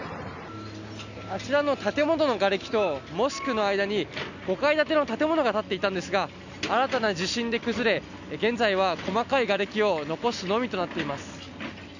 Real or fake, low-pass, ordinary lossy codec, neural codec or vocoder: real; 7.2 kHz; none; none